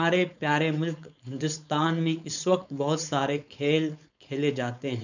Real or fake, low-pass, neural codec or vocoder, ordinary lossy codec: fake; 7.2 kHz; codec, 16 kHz, 4.8 kbps, FACodec; none